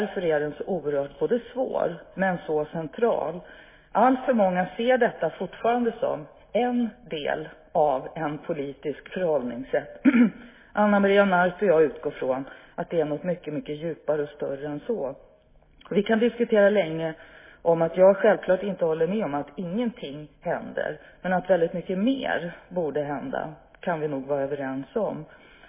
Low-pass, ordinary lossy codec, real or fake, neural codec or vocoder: 3.6 kHz; MP3, 16 kbps; real; none